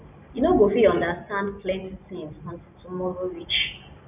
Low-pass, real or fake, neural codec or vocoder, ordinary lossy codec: 3.6 kHz; real; none; none